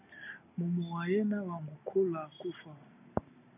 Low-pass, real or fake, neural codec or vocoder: 3.6 kHz; real; none